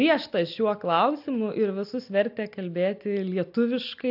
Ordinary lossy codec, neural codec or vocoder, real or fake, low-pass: AAC, 48 kbps; none; real; 5.4 kHz